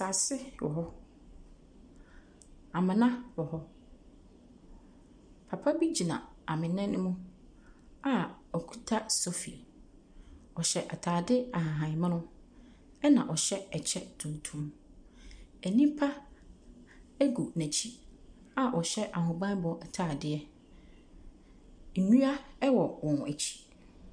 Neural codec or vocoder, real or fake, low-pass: none; real; 9.9 kHz